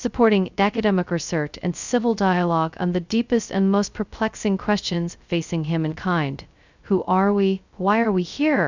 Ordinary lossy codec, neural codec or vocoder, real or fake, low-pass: Opus, 64 kbps; codec, 16 kHz, 0.2 kbps, FocalCodec; fake; 7.2 kHz